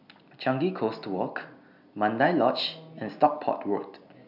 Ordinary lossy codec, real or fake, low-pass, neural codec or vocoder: none; real; 5.4 kHz; none